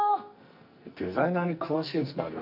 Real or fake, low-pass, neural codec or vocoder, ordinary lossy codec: fake; 5.4 kHz; codec, 44.1 kHz, 2.6 kbps, SNAC; none